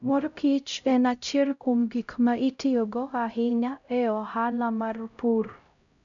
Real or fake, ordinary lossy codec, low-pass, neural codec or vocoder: fake; none; 7.2 kHz; codec, 16 kHz, 0.5 kbps, X-Codec, HuBERT features, trained on LibriSpeech